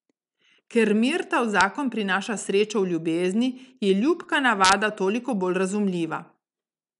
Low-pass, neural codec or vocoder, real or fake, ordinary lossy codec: 10.8 kHz; none; real; none